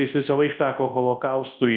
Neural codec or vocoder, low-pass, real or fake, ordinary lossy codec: codec, 24 kHz, 0.9 kbps, WavTokenizer, large speech release; 7.2 kHz; fake; Opus, 24 kbps